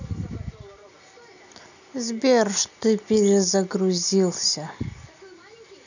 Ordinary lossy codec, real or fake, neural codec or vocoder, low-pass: none; real; none; 7.2 kHz